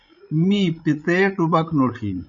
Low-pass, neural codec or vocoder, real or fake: 7.2 kHz; codec, 16 kHz, 16 kbps, FreqCodec, larger model; fake